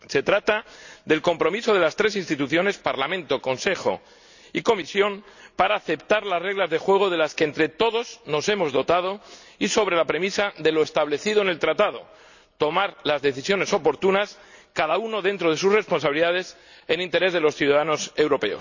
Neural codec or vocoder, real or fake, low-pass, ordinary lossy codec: none; real; 7.2 kHz; none